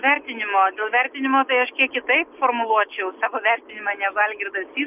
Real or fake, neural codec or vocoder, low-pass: real; none; 3.6 kHz